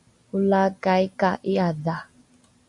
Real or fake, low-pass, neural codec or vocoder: real; 10.8 kHz; none